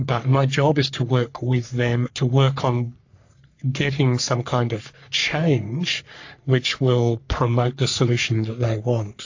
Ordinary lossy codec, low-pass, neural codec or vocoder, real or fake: AAC, 48 kbps; 7.2 kHz; codec, 44.1 kHz, 3.4 kbps, Pupu-Codec; fake